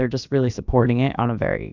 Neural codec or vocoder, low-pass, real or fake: codec, 16 kHz, about 1 kbps, DyCAST, with the encoder's durations; 7.2 kHz; fake